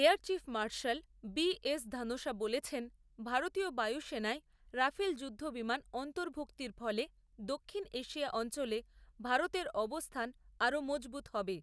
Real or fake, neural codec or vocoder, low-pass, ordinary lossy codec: real; none; none; none